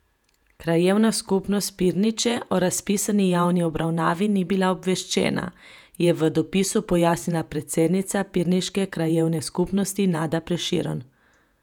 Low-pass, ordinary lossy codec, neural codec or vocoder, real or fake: 19.8 kHz; none; vocoder, 48 kHz, 128 mel bands, Vocos; fake